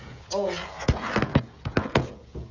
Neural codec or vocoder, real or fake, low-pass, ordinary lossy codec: codec, 16 kHz, 16 kbps, FreqCodec, smaller model; fake; 7.2 kHz; none